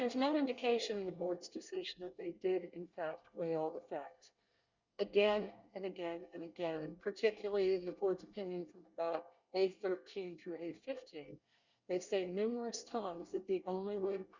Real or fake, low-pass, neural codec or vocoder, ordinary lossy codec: fake; 7.2 kHz; codec, 24 kHz, 1 kbps, SNAC; Opus, 64 kbps